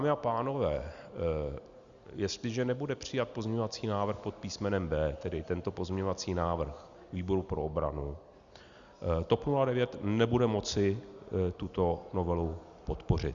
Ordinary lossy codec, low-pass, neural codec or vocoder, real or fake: Opus, 64 kbps; 7.2 kHz; none; real